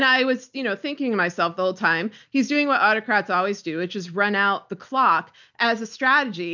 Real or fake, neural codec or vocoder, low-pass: real; none; 7.2 kHz